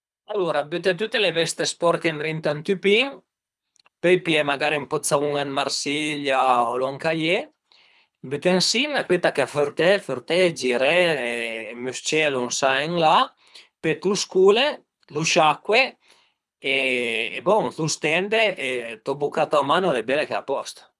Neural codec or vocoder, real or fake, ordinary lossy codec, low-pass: codec, 24 kHz, 3 kbps, HILCodec; fake; none; none